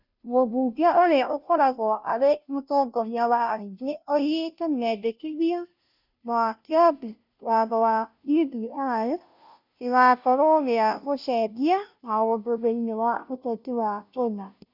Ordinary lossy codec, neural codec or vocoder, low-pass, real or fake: none; codec, 16 kHz, 0.5 kbps, FunCodec, trained on Chinese and English, 25 frames a second; 5.4 kHz; fake